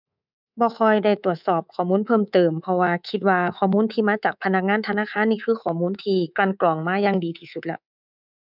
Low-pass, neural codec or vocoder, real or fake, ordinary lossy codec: 5.4 kHz; codec, 24 kHz, 3.1 kbps, DualCodec; fake; none